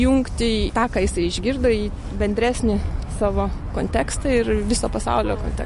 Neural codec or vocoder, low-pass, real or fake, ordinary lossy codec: none; 14.4 kHz; real; MP3, 48 kbps